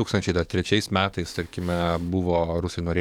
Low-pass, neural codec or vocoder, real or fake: 19.8 kHz; codec, 44.1 kHz, 7.8 kbps, DAC; fake